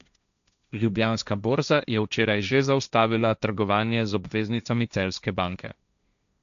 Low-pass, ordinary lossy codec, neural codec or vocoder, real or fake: 7.2 kHz; none; codec, 16 kHz, 1.1 kbps, Voila-Tokenizer; fake